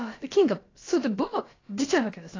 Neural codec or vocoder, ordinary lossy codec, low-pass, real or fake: codec, 16 kHz, about 1 kbps, DyCAST, with the encoder's durations; AAC, 32 kbps; 7.2 kHz; fake